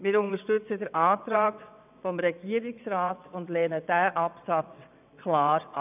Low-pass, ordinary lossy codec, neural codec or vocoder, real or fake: 3.6 kHz; none; vocoder, 22.05 kHz, 80 mel bands, WaveNeXt; fake